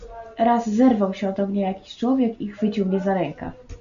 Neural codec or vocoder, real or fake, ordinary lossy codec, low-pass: none; real; MP3, 64 kbps; 7.2 kHz